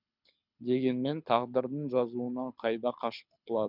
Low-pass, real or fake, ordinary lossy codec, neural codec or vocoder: 5.4 kHz; fake; none; codec, 24 kHz, 6 kbps, HILCodec